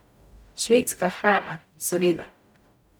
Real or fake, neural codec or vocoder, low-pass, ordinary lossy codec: fake; codec, 44.1 kHz, 0.9 kbps, DAC; none; none